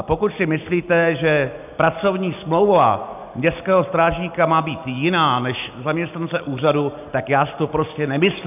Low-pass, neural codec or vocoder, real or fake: 3.6 kHz; none; real